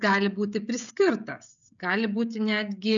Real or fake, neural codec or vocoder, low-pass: fake; codec, 16 kHz, 16 kbps, FreqCodec, smaller model; 7.2 kHz